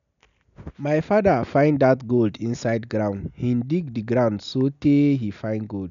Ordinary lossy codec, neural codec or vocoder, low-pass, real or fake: none; none; 7.2 kHz; real